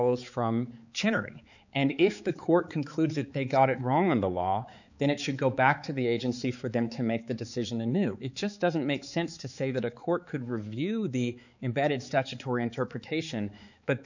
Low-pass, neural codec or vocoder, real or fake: 7.2 kHz; codec, 16 kHz, 4 kbps, X-Codec, HuBERT features, trained on balanced general audio; fake